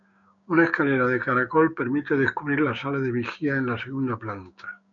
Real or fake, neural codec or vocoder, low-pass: fake; codec, 16 kHz, 6 kbps, DAC; 7.2 kHz